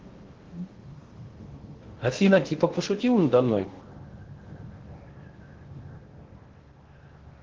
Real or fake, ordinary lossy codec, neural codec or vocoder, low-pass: fake; Opus, 16 kbps; codec, 16 kHz in and 24 kHz out, 0.8 kbps, FocalCodec, streaming, 65536 codes; 7.2 kHz